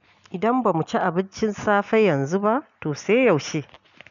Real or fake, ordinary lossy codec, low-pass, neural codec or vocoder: real; none; 7.2 kHz; none